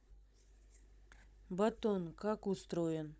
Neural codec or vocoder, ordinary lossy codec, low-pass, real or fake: codec, 16 kHz, 16 kbps, FunCodec, trained on Chinese and English, 50 frames a second; none; none; fake